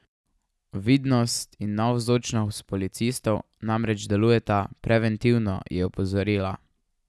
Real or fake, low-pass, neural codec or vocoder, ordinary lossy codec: real; none; none; none